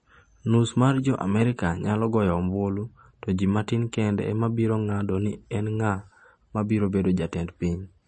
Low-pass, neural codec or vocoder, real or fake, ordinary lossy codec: 10.8 kHz; none; real; MP3, 32 kbps